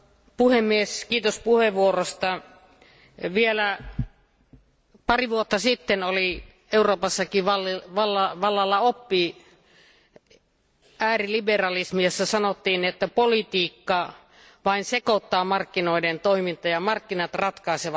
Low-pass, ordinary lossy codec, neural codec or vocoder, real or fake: none; none; none; real